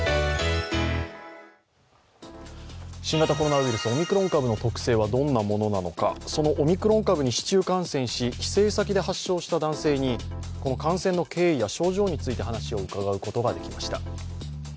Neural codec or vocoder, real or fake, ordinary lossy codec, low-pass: none; real; none; none